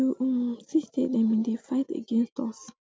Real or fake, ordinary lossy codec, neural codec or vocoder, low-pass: real; none; none; none